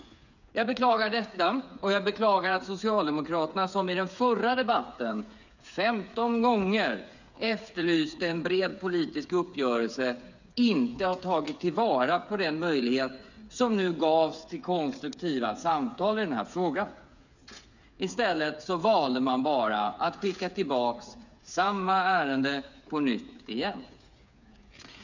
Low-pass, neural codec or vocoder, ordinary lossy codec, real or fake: 7.2 kHz; codec, 16 kHz, 8 kbps, FreqCodec, smaller model; none; fake